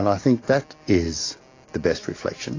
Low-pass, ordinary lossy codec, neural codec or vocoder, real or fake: 7.2 kHz; AAC, 32 kbps; none; real